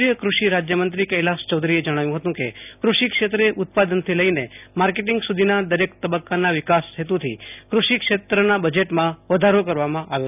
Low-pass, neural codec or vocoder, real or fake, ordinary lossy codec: 3.6 kHz; none; real; none